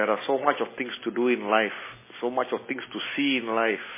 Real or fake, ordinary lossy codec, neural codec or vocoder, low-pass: real; MP3, 16 kbps; none; 3.6 kHz